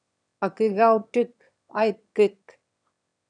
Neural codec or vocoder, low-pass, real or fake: autoencoder, 22.05 kHz, a latent of 192 numbers a frame, VITS, trained on one speaker; 9.9 kHz; fake